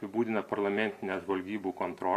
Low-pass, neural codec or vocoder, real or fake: 14.4 kHz; none; real